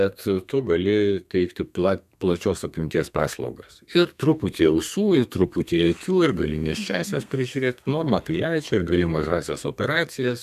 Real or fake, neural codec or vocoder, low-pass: fake; codec, 32 kHz, 1.9 kbps, SNAC; 14.4 kHz